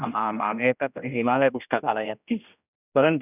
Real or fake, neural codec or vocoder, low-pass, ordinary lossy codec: fake; codec, 16 kHz, 1 kbps, X-Codec, HuBERT features, trained on general audio; 3.6 kHz; none